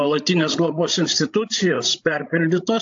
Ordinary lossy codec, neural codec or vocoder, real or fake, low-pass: AAC, 64 kbps; codec, 16 kHz, 16 kbps, FreqCodec, larger model; fake; 7.2 kHz